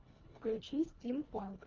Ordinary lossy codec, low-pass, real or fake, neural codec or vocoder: Opus, 32 kbps; 7.2 kHz; fake; codec, 24 kHz, 1.5 kbps, HILCodec